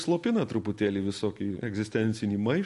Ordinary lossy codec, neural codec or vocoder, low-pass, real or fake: MP3, 48 kbps; none; 14.4 kHz; real